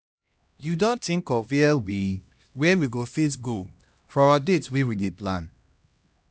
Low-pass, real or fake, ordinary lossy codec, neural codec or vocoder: none; fake; none; codec, 16 kHz, 1 kbps, X-Codec, HuBERT features, trained on LibriSpeech